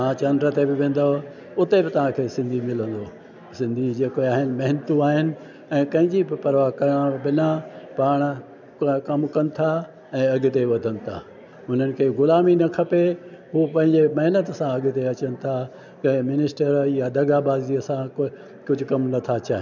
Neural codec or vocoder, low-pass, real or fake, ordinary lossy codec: none; 7.2 kHz; real; none